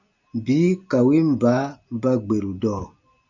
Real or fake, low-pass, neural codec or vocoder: real; 7.2 kHz; none